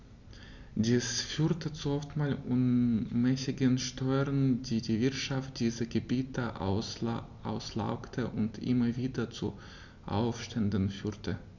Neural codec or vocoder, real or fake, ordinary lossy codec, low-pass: none; real; none; 7.2 kHz